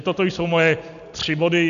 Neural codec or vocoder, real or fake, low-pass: none; real; 7.2 kHz